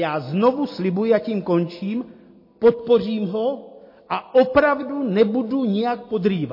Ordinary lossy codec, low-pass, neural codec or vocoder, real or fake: MP3, 24 kbps; 5.4 kHz; none; real